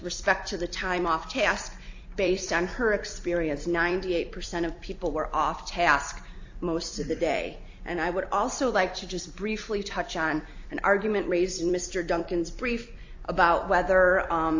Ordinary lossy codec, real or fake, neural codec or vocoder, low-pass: AAC, 48 kbps; fake; vocoder, 44.1 kHz, 128 mel bands every 256 samples, BigVGAN v2; 7.2 kHz